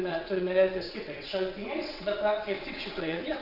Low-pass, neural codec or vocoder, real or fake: 5.4 kHz; vocoder, 22.05 kHz, 80 mel bands, WaveNeXt; fake